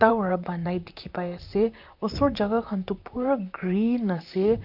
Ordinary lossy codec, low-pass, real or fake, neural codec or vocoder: none; 5.4 kHz; fake; vocoder, 44.1 kHz, 128 mel bands, Pupu-Vocoder